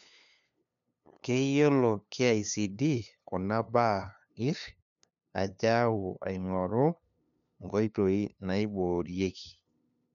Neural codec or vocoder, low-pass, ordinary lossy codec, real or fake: codec, 16 kHz, 2 kbps, FunCodec, trained on LibriTTS, 25 frames a second; 7.2 kHz; none; fake